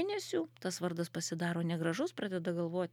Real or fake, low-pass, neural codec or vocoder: real; 19.8 kHz; none